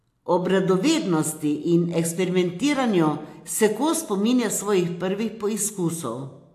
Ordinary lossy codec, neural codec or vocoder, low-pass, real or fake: AAC, 64 kbps; none; 14.4 kHz; real